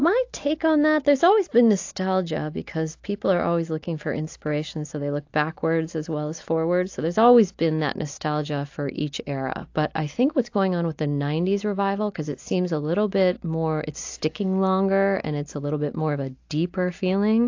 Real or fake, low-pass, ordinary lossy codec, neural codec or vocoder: real; 7.2 kHz; AAC, 48 kbps; none